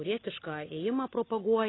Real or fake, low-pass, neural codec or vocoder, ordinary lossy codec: real; 7.2 kHz; none; AAC, 16 kbps